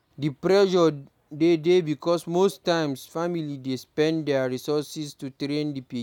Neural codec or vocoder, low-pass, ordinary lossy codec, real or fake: none; 19.8 kHz; none; real